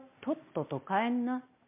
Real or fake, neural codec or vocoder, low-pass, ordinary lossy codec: real; none; 3.6 kHz; MP3, 32 kbps